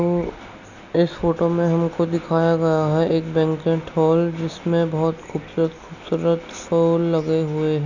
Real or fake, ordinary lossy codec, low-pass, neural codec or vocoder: real; none; 7.2 kHz; none